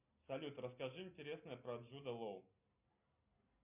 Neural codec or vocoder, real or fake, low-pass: none; real; 3.6 kHz